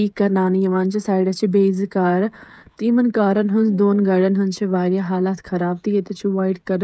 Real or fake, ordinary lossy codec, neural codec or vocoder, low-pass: fake; none; codec, 16 kHz, 16 kbps, FreqCodec, smaller model; none